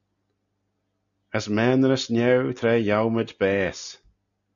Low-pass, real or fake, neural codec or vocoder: 7.2 kHz; real; none